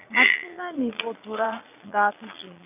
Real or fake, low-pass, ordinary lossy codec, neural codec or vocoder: fake; 3.6 kHz; none; vocoder, 22.05 kHz, 80 mel bands, Vocos